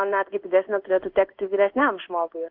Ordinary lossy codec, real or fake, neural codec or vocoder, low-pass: Opus, 16 kbps; fake; codec, 16 kHz in and 24 kHz out, 1 kbps, XY-Tokenizer; 5.4 kHz